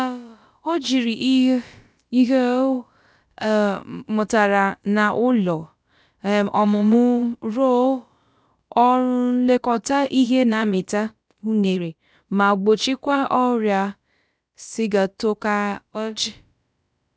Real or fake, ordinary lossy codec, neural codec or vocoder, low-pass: fake; none; codec, 16 kHz, about 1 kbps, DyCAST, with the encoder's durations; none